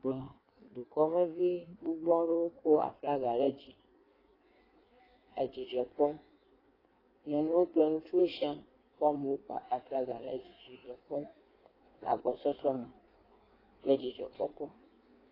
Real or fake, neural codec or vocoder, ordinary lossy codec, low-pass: fake; codec, 16 kHz in and 24 kHz out, 1.1 kbps, FireRedTTS-2 codec; AAC, 24 kbps; 5.4 kHz